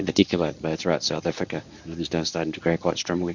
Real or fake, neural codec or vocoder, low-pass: fake; codec, 24 kHz, 0.9 kbps, WavTokenizer, medium speech release version 1; 7.2 kHz